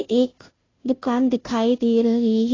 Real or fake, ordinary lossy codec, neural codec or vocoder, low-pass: fake; AAC, 32 kbps; codec, 16 kHz, 0.5 kbps, FunCodec, trained on Chinese and English, 25 frames a second; 7.2 kHz